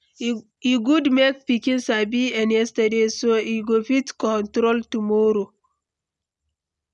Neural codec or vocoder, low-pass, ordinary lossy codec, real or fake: none; 10.8 kHz; none; real